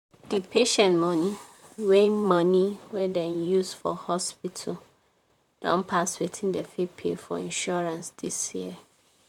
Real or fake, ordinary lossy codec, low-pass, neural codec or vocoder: fake; MP3, 96 kbps; 19.8 kHz; vocoder, 44.1 kHz, 128 mel bands, Pupu-Vocoder